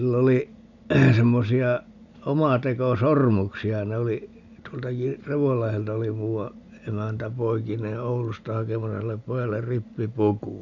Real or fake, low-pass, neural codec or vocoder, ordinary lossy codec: real; 7.2 kHz; none; none